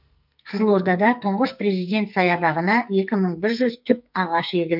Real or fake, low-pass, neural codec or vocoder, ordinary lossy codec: fake; 5.4 kHz; codec, 44.1 kHz, 2.6 kbps, SNAC; none